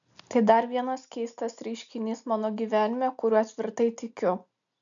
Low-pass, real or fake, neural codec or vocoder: 7.2 kHz; real; none